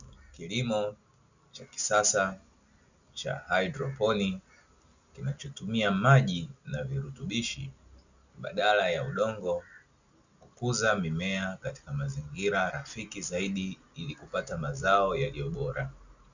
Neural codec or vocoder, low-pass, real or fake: none; 7.2 kHz; real